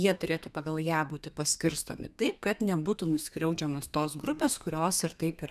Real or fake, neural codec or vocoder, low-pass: fake; codec, 32 kHz, 1.9 kbps, SNAC; 14.4 kHz